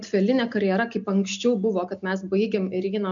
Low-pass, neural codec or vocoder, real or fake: 7.2 kHz; none; real